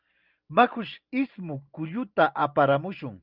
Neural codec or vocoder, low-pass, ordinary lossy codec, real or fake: none; 5.4 kHz; Opus, 24 kbps; real